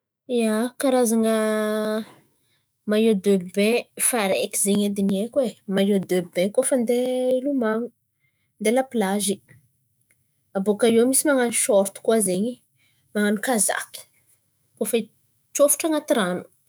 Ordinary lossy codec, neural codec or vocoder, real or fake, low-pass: none; autoencoder, 48 kHz, 128 numbers a frame, DAC-VAE, trained on Japanese speech; fake; none